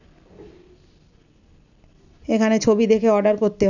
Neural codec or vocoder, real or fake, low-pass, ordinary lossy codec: none; real; 7.2 kHz; none